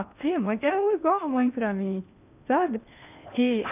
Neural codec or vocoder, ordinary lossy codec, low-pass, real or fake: codec, 16 kHz in and 24 kHz out, 0.8 kbps, FocalCodec, streaming, 65536 codes; none; 3.6 kHz; fake